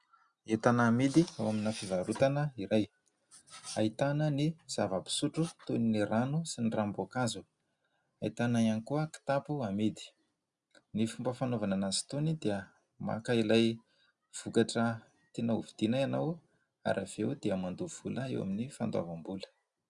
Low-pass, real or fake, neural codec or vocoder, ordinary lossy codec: 10.8 kHz; real; none; Opus, 64 kbps